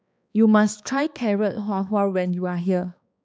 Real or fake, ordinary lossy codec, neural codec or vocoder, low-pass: fake; none; codec, 16 kHz, 2 kbps, X-Codec, HuBERT features, trained on balanced general audio; none